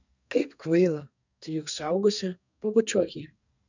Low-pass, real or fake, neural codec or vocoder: 7.2 kHz; fake; codec, 32 kHz, 1.9 kbps, SNAC